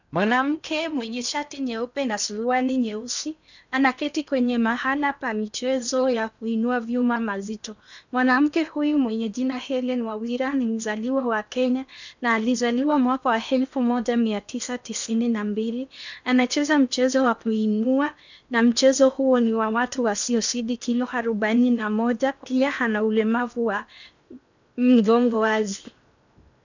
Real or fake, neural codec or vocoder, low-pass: fake; codec, 16 kHz in and 24 kHz out, 0.8 kbps, FocalCodec, streaming, 65536 codes; 7.2 kHz